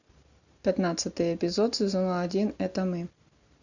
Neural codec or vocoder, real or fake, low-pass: none; real; 7.2 kHz